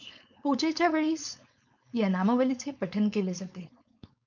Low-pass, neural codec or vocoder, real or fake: 7.2 kHz; codec, 16 kHz, 4.8 kbps, FACodec; fake